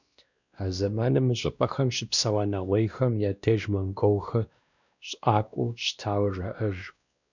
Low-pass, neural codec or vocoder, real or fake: 7.2 kHz; codec, 16 kHz, 1 kbps, X-Codec, WavLM features, trained on Multilingual LibriSpeech; fake